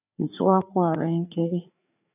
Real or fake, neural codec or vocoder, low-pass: fake; codec, 16 kHz, 4 kbps, FreqCodec, larger model; 3.6 kHz